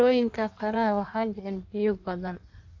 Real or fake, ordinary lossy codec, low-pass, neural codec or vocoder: fake; AAC, 48 kbps; 7.2 kHz; codec, 16 kHz in and 24 kHz out, 1.1 kbps, FireRedTTS-2 codec